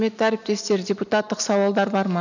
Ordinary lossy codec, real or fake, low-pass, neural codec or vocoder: none; real; 7.2 kHz; none